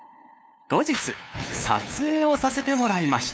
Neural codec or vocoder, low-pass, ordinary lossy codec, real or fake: codec, 16 kHz, 2 kbps, FunCodec, trained on LibriTTS, 25 frames a second; none; none; fake